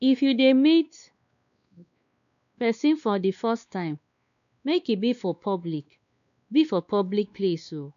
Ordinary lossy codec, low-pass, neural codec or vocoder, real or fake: none; 7.2 kHz; codec, 16 kHz, 2 kbps, X-Codec, WavLM features, trained on Multilingual LibriSpeech; fake